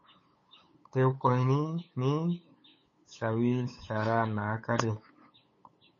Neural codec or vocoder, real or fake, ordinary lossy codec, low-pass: codec, 16 kHz, 8 kbps, FunCodec, trained on LibriTTS, 25 frames a second; fake; MP3, 32 kbps; 7.2 kHz